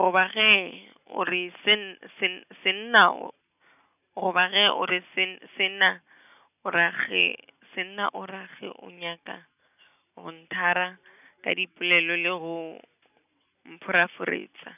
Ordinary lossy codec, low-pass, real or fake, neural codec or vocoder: none; 3.6 kHz; real; none